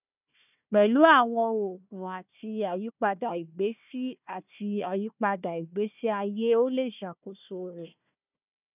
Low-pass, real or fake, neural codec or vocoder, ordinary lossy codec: 3.6 kHz; fake; codec, 16 kHz, 1 kbps, FunCodec, trained on Chinese and English, 50 frames a second; none